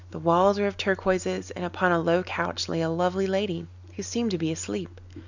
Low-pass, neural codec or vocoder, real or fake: 7.2 kHz; none; real